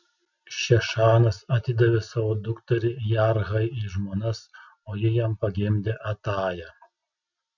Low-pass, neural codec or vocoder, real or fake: 7.2 kHz; none; real